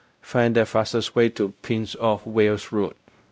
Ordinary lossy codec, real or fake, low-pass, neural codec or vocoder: none; fake; none; codec, 16 kHz, 0.5 kbps, X-Codec, WavLM features, trained on Multilingual LibriSpeech